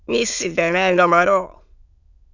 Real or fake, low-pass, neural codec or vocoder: fake; 7.2 kHz; autoencoder, 22.05 kHz, a latent of 192 numbers a frame, VITS, trained on many speakers